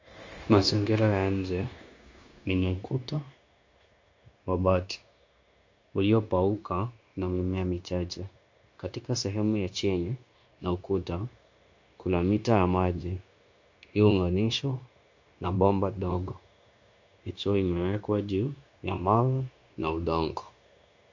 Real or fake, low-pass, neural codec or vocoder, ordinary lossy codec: fake; 7.2 kHz; codec, 16 kHz, 0.9 kbps, LongCat-Audio-Codec; MP3, 48 kbps